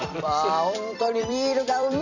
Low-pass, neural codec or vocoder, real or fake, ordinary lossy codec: 7.2 kHz; vocoder, 44.1 kHz, 128 mel bands every 256 samples, BigVGAN v2; fake; none